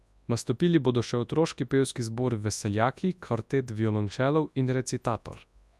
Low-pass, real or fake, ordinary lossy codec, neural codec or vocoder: none; fake; none; codec, 24 kHz, 0.9 kbps, WavTokenizer, large speech release